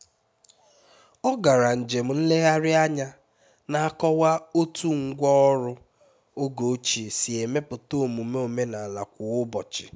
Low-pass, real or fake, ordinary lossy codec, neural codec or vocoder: none; real; none; none